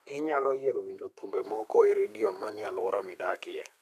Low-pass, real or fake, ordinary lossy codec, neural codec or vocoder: 14.4 kHz; fake; none; codec, 32 kHz, 1.9 kbps, SNAC